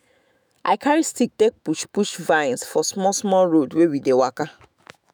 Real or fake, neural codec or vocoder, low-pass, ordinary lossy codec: fake; autoencoder, 48 kHz, 128 numbers a frame, DAC-VAE, trained on Japanese speech; none; none